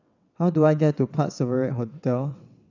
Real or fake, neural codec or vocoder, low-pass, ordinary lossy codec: fake; vocoder, 22.05 kHz, 80 mel bands, WaveNeXt; 7.2 kHz; none